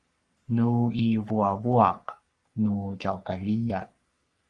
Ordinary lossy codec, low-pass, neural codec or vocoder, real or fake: Opus, 32 kbps; 10.8 kHz; codec, 44.1 kHz, 3.4 kbps, Pupu-Codec; fake